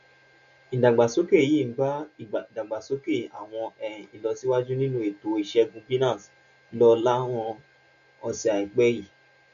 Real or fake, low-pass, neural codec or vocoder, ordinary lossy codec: real; 7.2 kHz; none; none